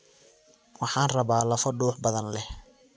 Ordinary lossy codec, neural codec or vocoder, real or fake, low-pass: none; none; real; none